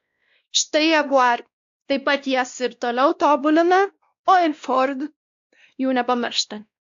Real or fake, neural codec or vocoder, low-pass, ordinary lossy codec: fake; codec, 16 kHz, 1 kbps, X-Codec, WavLM features, trained on Multilingual LibriSpeech; 7.2 kHz; AAC, 64 kbps